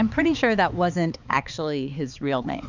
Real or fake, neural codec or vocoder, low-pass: fake; codec, 16 kHz, 4 kbps, X-Codec, HuBERT features, trained on balanced general audio; 7.2 kHz